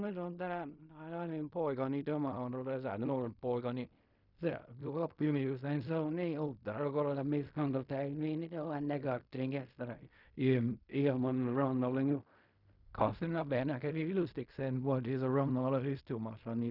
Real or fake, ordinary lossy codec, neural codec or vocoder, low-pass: fake; none; codec, 16 kHz in and 24 kHz out, 0.4 kbps, LongCat-Audio-Codec, fine tuned four codebook decoder; 5.4 kHz